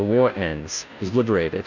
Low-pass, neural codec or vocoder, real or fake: 7.2 kHz; codec, 16 kHz, 0.5 kbps, FunCodec, trained on Chinese and English, 25 frames a second; fake